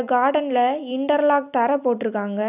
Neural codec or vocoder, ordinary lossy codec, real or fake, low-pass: none; none; real; 3.6 kHz